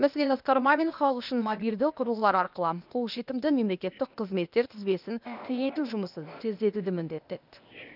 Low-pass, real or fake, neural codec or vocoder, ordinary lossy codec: 5.4 kHz; fake; codec, 16 kHz, 0.8 kbps, ZipCodec; none